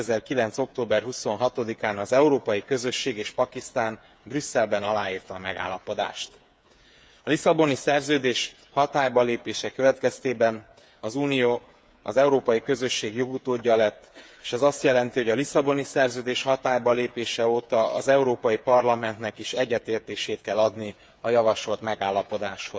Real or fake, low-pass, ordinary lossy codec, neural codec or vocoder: fake; none; none; codec, 16 kHz, 8 kbps, FreqCodec, smaller model